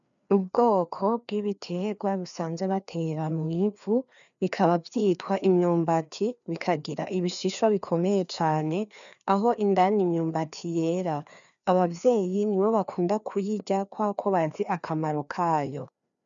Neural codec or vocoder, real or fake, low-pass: codec, 16 kHz, 2 kbps, FreqCodec, larger model; fake; 7.2 kHz